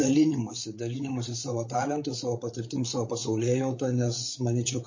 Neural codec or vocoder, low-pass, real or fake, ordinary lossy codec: codec, 16 kHz, 8 kbps, FreqCodec, larger model; 7.2 kHz; fake; MP3, 32 kbps